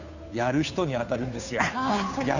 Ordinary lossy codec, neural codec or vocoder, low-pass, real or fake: none; codec, 16 kHz, 2 kbps, FunCodec, trained on Chinese and English, 25 frames a second; 7.2 kHz; fake